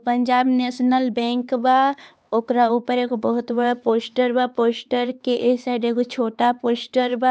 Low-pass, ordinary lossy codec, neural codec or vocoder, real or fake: none; none; codec, 16 kHz, 4 kbps, X-Codec, HuBERT features, trained on LibriSpeech; fake